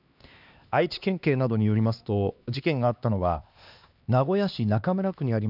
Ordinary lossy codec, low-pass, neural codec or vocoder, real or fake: none; 5.4 kHz; codec, 16 kHz, 2 kbps, X-Codec, HuBERT features, trained on LibriSpeech; fake